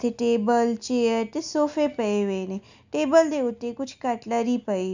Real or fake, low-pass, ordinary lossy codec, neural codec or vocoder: real; 7.2 kHz; none; none